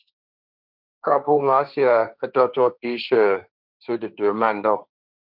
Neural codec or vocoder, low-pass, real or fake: codec, 16 kHz, 1.1 kbps, Voila-Tokenizer; 5.4 kHz; fake